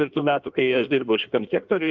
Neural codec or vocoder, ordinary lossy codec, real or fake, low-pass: codec, 16 kHz in and 24 kHz out, 1.1 kbps, FireRedTTS-2 codec; Opus, 32 kbps; fake; 7.2 kHz